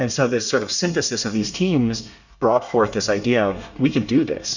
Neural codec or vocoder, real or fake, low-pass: codec, 24 kHz, 1 kbps, SNAC; fake; 7.2 kHz